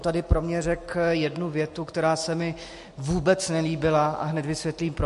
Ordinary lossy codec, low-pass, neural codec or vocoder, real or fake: MP3, 48 kbps; 14.4 kHz; none; real